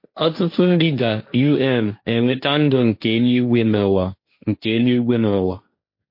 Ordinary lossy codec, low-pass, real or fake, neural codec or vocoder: MP3, 32 kbps; 5.4 kHz; fake; codec, 16 kHz, 1.1 kbps, Voila-Tokenizer